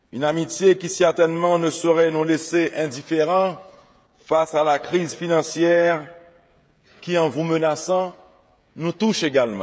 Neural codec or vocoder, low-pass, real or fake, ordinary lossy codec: codec, 16 kHz, 16 kbps, FreqCodec, smaller model; none; fake; none